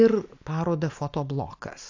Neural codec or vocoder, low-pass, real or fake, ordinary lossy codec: none; 7.2 kHz; real; AAC, 48 kbps